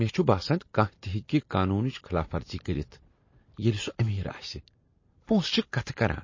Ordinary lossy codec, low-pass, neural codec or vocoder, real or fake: MP3, 32 kbps; 7.2 kHz; none; real